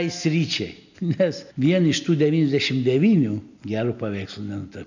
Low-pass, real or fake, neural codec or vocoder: 7.2 kHz; real; none